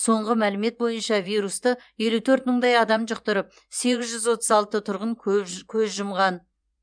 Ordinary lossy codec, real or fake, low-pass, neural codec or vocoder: none; fake; 9.9 kHz; vocoder, 24 kHz, 100 mel bands, Vocos